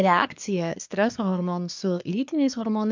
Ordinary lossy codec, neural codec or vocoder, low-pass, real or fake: MP3, 64 kbps; codec, 24 kHz, 1 kbps, SNAC; 7.2 kHz; fake